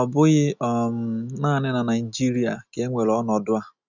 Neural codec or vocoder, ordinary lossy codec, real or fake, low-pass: none; none; real; 7.2 kHz